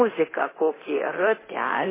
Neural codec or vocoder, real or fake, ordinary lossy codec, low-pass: codec, 24 kHz, 0.9 kbps, DualCodec; fake; MP3, 16 kbps; 3.6 kHz